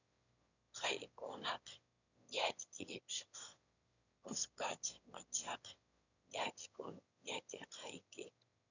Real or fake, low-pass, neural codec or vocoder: fake; 7.2 kHz; autoencoder, 22.05 kHz, a latent of 192 numbers a frame, VITS, trained on one speaker